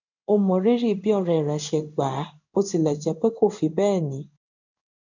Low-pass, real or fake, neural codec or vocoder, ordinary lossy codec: 7.2 kHz; fake; codec, 16 kHz in and 24 kHz out, 1 kbps, XY-Tokenizer; none